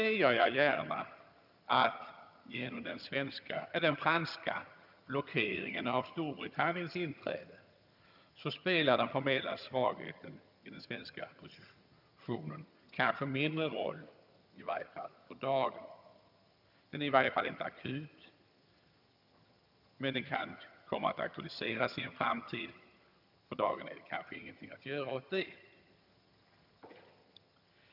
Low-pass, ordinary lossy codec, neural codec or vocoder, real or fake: 5.4 kHz; none; vocoder, 22.05 kHz, 80 mel bands, HiFi-GAN; fake